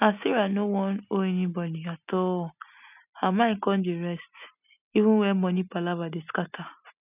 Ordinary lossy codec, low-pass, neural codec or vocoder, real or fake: none; 3.6 kHz; none; real